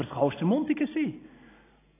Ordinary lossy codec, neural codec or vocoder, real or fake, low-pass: AAC, 16 kbps; none; real; 3.6 kHz